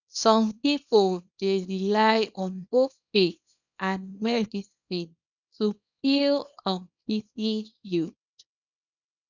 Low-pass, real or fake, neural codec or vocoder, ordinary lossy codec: 7.2 kHz; fake; codec, 24 kHz, 0.9 kbps, WavTokenizer, small release; none